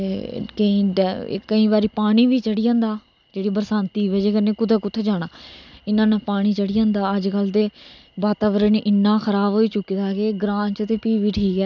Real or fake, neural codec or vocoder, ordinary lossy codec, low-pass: real; none; none; 7.2 kHz